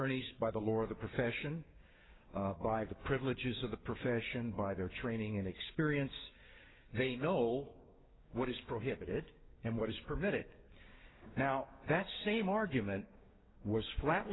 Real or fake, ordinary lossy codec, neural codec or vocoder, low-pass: fake; AAC, 16 kbps; codec, 16 kHz, 6 kbps, DAC; 7.2 kHz